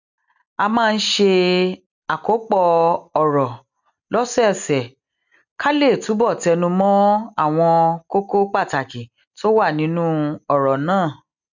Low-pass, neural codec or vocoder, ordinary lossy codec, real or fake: 7.2 kHz; none; none; real